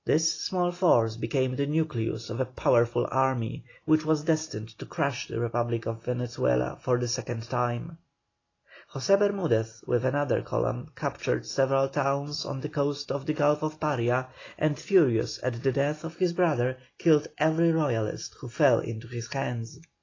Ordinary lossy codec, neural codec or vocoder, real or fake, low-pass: AAC, 32 kbps; none; real; 7.2 kHz